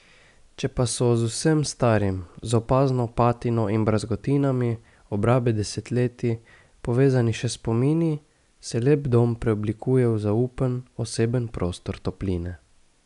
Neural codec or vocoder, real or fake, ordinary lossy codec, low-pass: none; real; none; 10.8 kHz